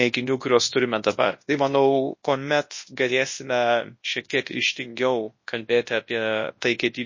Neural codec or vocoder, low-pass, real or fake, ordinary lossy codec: codec, 24 kHz, 0.9 kbps, WavTokenizer, large speech release; 7.2 kHz; fake; MP3, 32 kbps